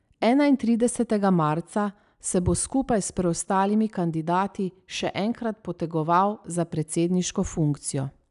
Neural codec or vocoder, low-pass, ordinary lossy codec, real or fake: none; 10.8 kHz; none; real